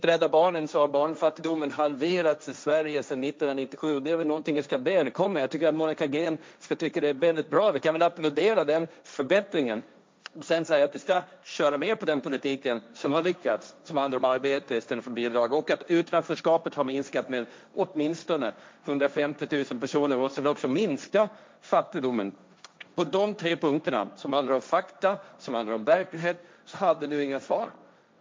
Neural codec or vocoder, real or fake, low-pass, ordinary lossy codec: codec, 16 kHz, 1.1 kbps, Voila-Tokenizer; fake; none; none